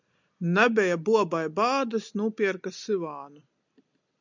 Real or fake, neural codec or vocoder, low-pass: real; none; 7.2 kHz